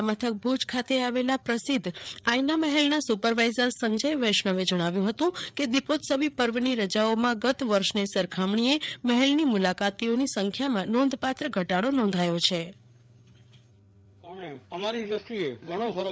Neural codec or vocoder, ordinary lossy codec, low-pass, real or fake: codec, 16 kHz, 4 kbps, FreqCodec, larger model; none; none; fake